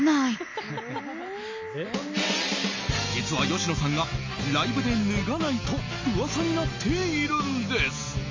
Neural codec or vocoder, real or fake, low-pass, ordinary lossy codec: none; real; 7.2 kHz; MP3, 32 kbps